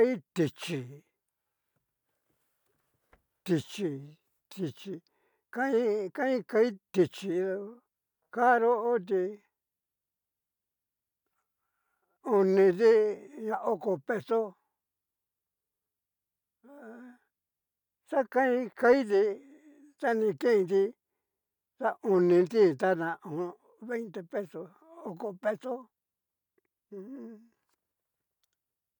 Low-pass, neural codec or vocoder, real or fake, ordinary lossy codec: 19.8 kHz; none; real; none